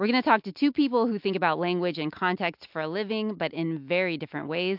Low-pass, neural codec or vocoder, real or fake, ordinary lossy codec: 5.4 kHz; none; real; MP3, 48 kbps